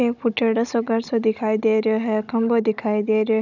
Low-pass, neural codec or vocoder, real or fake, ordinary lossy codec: 7.2 kHz; codec, 16 kHz, 16 kbps, FunCodec, trained on Chinese and English, 50 frames a second; fake; none